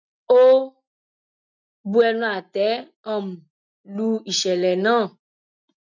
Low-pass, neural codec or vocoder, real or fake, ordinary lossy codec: 7.2 kHz; none; real; none